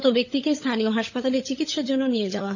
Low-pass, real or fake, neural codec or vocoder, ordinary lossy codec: 7.2 kHz; fake; codec, 16 kHz, 16 kbps, FunCodec, trained on LibriTTS, 50 frames a second; none